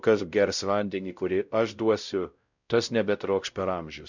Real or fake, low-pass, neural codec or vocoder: fake; 7.2 kHz; codec, 16 kHz, 0.5 kbps, X-Codec, WavLM features, trained on Multilingual LibriSpeech